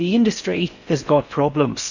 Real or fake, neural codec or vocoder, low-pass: fake; codec, 16 kHz in and 24 kHz out, 0.6 kbps, FocalCodec, streaming, 4096 codes; 7.2 kHz